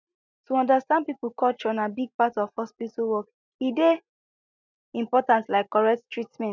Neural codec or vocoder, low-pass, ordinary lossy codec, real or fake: none; 7.2 kHz; none; real